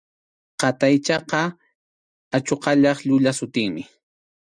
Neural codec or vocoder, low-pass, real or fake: none; 9.9 kHz; real